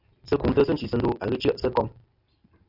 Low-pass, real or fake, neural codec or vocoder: 5.4 kHz; real; none